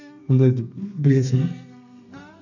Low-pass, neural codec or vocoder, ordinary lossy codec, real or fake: 7.2 kHz; codec, 32 kHz, 1.9 kbps, SNAC; none; fake